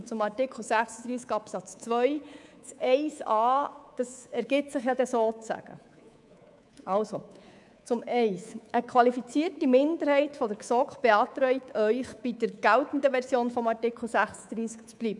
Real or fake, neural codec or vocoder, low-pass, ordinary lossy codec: fake; codec, 24 kHz, 3.1 kbps, DualCodec; 10.8 kHz; none